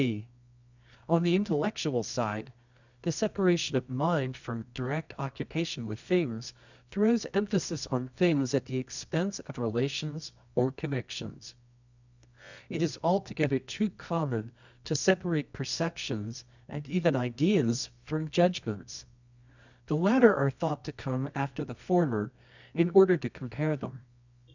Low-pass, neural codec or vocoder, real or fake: 7.2 kHz; codec, 24 kHz, 0.9 kbps, WavTokenizer, medium music audio release; fake